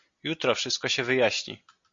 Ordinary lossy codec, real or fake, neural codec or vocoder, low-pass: MP3, 48 kbps; real; none; 7.2 kHz